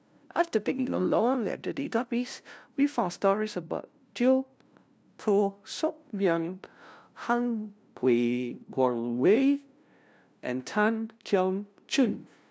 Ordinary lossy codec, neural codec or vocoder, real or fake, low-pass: none; codec, 16 kHz, 0.5 kbps, FunCodec, trained on LibriTTS, 25 frames a second; fake; none